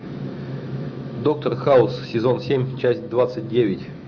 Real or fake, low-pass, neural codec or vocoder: fake; 7.2 kHz; autoencoder, 48 kHz, 128 numbers a frame, DAC-VAE, trained on Japanese speech